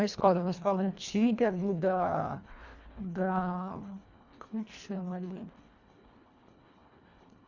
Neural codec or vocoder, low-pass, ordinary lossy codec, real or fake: codec, 24 kHz, 1.5 kbps, HILCodec; 7.2 kHz; Opus, 64 kbps; fake